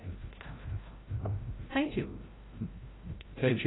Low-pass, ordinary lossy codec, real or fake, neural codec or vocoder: 7.2 kHz; AAC, 16 kbps; fake; codec, 16 kHz, 0.5 kbps, FreqCodec, larger model